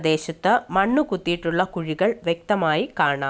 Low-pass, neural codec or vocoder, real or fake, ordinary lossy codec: none; none; real; none